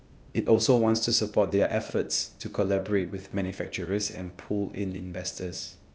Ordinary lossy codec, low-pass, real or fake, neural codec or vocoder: none; none; fake; codec, 16 kHz, 0.8 kbps, ZipCodec